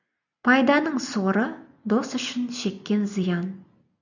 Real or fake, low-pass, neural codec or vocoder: real; 7.2 kHz; none